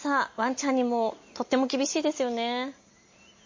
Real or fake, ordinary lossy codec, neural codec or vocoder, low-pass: real; MP3, 32 kbps; none; 7.2 kHz